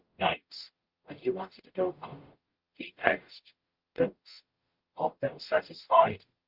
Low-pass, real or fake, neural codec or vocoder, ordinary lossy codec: 5.4 kHz; fake; codec, 44.1 kHz, 0.9 kbps, DAC; Opus, 32 kbps